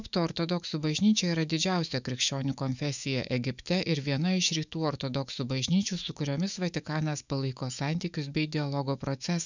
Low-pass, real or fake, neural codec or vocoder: 7.2 kHz; fake; autoencoder, 48 kHz, 128 numbers a frame, DAC-VAE, trained on Japanese speech